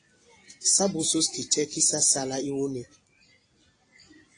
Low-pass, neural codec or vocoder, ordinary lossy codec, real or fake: 9.9 kHz; none; AAC, 32 kbps; real